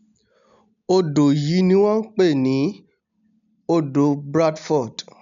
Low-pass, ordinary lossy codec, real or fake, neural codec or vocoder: 7.2 kHz; none; real; none